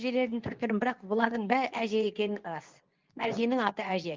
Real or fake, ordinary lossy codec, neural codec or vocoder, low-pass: fake; Opus, 32 kbps; codec, 24 kHz, 0.9 kbps, WavTokenizer, medium speech release version 2; 7.2 kHz